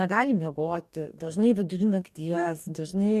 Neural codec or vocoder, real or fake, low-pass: codec, 44.1 kHz, 2.6 kbps, DAC; fake; 14.4 kHz